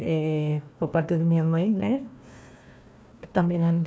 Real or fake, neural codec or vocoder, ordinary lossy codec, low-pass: fake; codec, 16 kHz, 1 kbps, FunCodec, trained on Chinese and English, 50 frames a second; none; none